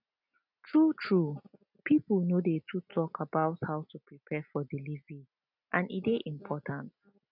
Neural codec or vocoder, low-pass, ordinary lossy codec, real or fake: none; 5.4 kHz; none; real